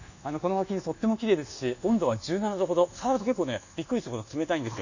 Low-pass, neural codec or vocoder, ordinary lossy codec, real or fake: 7.2 kHz; codec, 24 kHz, 1.2 kbps, DualCodec; none; fake